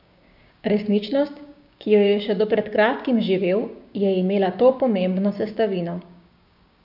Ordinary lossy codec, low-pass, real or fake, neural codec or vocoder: none; 5.4 kHz; fake; codec, 16 kHz, 6 kbps, DAC